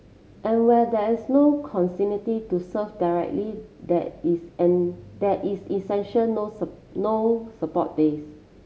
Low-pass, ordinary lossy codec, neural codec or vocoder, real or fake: none; none; none; real